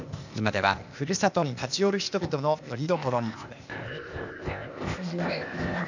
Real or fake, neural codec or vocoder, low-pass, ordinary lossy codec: fake; codec, 16 kHz, 0.8 kbps, ZipCodec; 7.2 kHz; none